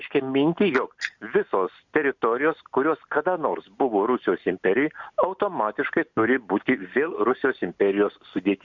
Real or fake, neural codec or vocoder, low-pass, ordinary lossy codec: real; none; 7.2 kHz; Opus, 64 kbps